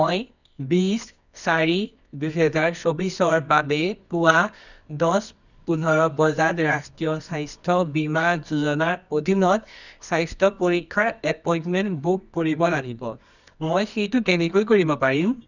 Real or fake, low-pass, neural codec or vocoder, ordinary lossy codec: fake; 7.2 kHz; codec, 24 kHz, 0.9 kbps, WavTokenizer, medium music audio release; none